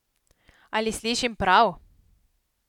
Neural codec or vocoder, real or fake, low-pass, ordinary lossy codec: none; real; 19.8 kHz; none